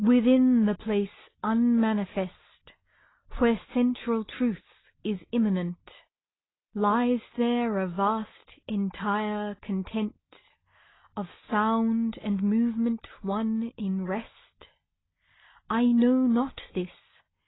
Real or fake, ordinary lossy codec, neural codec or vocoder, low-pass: real; AAC, 16 kbps; none; 7.2 kHz